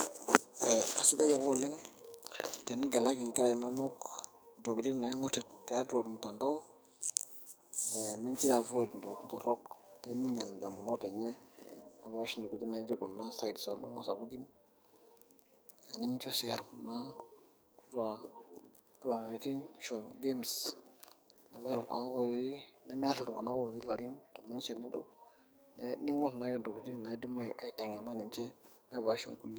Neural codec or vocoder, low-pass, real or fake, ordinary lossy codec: codec, 44.1 kHz, 2.6 kbps, SNAC; none; fake; none